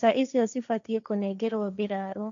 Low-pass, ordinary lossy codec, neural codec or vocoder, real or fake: 7.2 kHz; none; codec, 16 kHz, 1.1 kbps, Voila-Tokenizer; fake